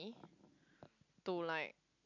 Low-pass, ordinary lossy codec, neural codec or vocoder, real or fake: 7.2 kHz; none; none; real